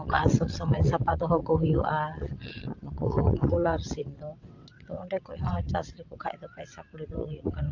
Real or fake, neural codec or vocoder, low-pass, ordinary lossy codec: fake; vocoder, 22.05 kHz, 80 mel bands, WaveNeXt; 7.2 kHz; AAC, 48 kbps